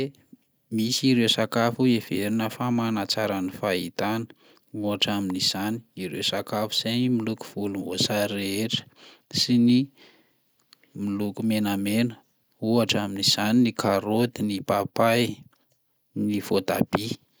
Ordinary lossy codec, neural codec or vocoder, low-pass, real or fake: none; none; none; real